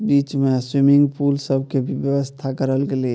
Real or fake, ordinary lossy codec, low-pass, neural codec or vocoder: real; none; none; none